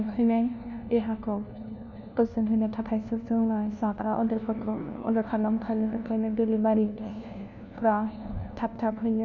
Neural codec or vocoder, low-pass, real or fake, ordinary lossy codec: codec, 16 kHz, 1 kbps, FunCodec, trained on LibriTTS, 50 frames a second; 7.2 kHz; fake; none